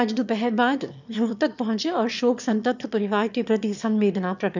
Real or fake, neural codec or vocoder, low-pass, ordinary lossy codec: fake; autoencoder, 22.05 kHz, a latent of 192 numbers a frame, VITS, trained on one speaker; 7.2 kHz; none